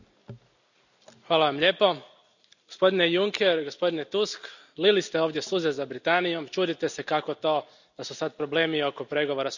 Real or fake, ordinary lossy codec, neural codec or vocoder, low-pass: real; none; none; 7.2 kHz